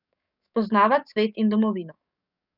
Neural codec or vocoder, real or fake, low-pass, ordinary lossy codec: codec, 44.1 kHz, 7.8 kbps, DAC; fake; 5.4 kHz; none